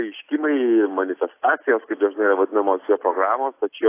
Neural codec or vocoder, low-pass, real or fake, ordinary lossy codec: none; 3.6 kHz; real; AAC, 24 kbps